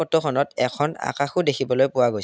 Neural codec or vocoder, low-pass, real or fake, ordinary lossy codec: none; none; real; none